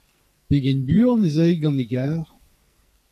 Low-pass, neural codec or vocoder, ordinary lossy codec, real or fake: 14.4 kHz; codec, 44.1 kHz, 2.6 kbps, SNAC; MP3, 96 kbps; fake